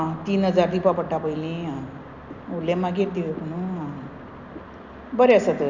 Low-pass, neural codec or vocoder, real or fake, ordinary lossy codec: 7.2 kHz; none; real; none